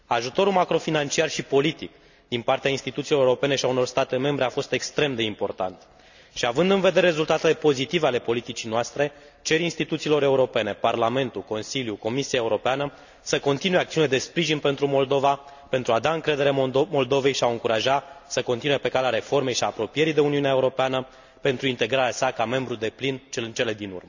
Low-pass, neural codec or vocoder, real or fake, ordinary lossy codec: 7.2 kHz; none; real; none